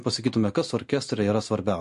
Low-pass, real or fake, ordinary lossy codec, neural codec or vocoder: 14.4 kHz; real; MP3, 48 kbps; none